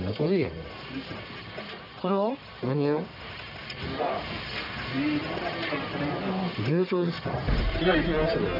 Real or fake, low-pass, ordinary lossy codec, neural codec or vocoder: fake; 5.4 kHz; none; codec, 44.1 kHz, 1.7 kbps, Pupu-Codec